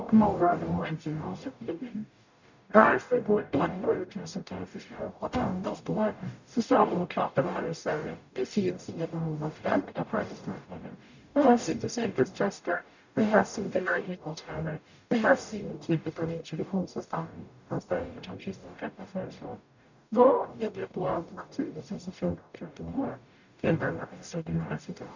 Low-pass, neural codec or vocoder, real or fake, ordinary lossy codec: 7.2 kHz; codec, 44.1 kHz, 0.9 kbps, DAC; fake; none